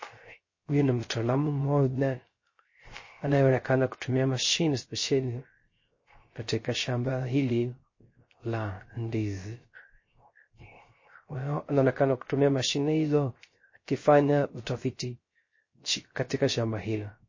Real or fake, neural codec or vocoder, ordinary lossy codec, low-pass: fake; codec, 16 kHz, 0.3 kbps, FocalCodec; MP3, 32 kbps; 7.2 kHz